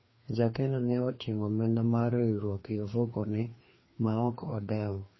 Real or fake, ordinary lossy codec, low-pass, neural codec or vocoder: fake; MP3, 24 kbps; 7.2 kHz; codec, 16 kHz, 2 kbps, FreqCodec, larger model